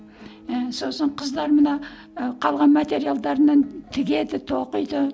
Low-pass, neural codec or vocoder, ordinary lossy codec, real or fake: none; none; none; real